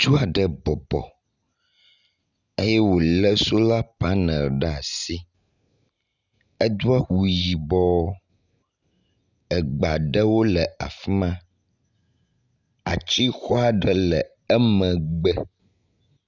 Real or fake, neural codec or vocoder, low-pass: real; none; 7.2 kHz